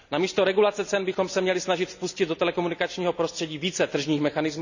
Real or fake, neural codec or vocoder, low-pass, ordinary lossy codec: real; none; 7.2 kHz; none